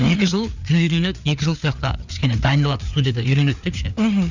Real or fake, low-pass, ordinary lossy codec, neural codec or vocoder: fake; 7.2 kHz; none; codec, 16 kHz, 4 kbps, FreqCodec, larger model